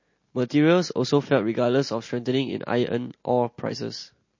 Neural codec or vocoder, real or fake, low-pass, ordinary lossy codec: none; real; 7.2 kHz; MP3, 32 kbps